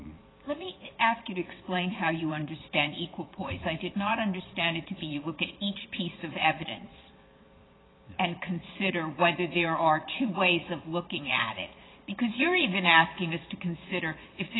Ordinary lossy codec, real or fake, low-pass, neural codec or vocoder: AAC, 16 kbps; fake; 7.2 kHz; vocoder, 22.05 kHz, 80 mel bands, Vocos